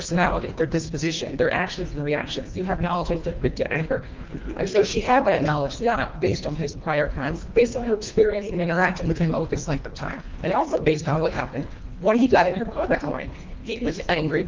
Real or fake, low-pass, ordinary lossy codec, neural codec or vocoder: fake; 7.2 kHz; Opus, 24 kbps; codec, 24 kHz, 1.5 kbps, HILCodec